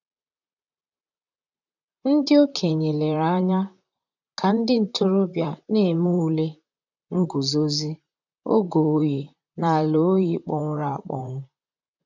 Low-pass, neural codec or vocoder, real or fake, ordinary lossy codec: 7.2 kHz; vocoder, 44.1 kHz, 128 mel bands, Pupu-Vocoder; fake; none